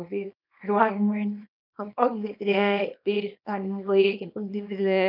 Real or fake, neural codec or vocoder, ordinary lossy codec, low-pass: fake; codec, 24 kHz, 0.9 kbps, WavTokenizer, small release; none; 5.4 kHz